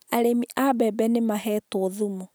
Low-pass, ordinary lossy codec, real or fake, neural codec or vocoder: none; none; real; none